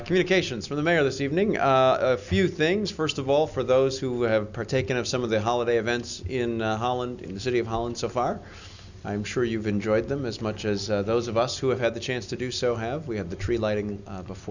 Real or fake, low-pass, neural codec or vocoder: real; 7.2 kHz; none